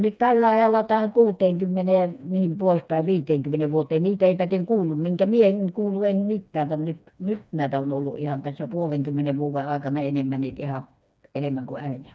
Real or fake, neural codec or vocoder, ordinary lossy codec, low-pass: fake; codec, 16 kHz, 2 kbps, FreqCodec, smaller model; none; none